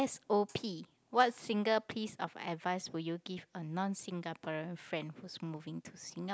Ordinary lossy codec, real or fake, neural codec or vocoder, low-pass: none; real; none; none